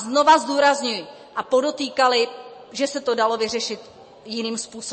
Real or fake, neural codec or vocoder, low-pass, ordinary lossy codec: real; none; 9.9 kHz; MP3, 32 kbps